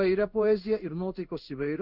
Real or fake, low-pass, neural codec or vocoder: fake; 5.4 kHz; codec, 16 kHz in and 24 kHz out, 1 kbps, XY-Tokenizer